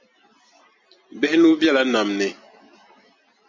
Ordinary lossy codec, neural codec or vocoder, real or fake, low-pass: MP3, 48 kbps; none; real; 7.2 kHz